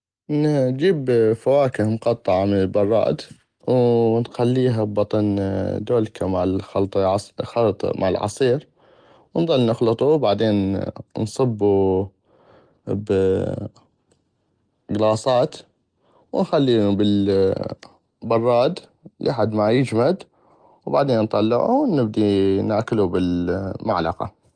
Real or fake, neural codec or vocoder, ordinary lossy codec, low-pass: real; none; Opus, 24 kbps; 9.9 kHz